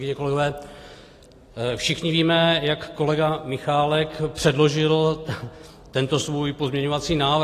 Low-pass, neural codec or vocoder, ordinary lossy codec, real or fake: 14.4 kHz; none; AAC, 48 kbps; real